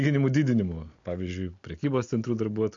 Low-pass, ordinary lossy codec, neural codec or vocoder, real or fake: 7.2 kHz; MP3, 48 kbps; none; real